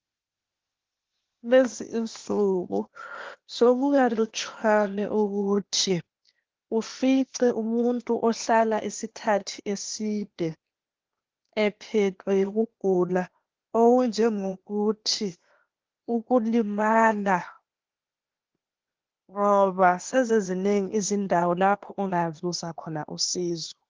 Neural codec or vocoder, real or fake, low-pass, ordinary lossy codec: codec, 16 kHz, 0.8 kbps, ZipCodec; fake; 7.2 kHz; Opus, 16 kbps